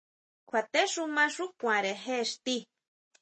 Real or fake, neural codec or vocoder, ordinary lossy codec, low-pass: real; none; MP3, 32 kbps; 9.9 kHz